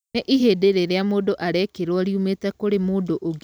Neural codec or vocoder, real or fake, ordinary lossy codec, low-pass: none; real; none; none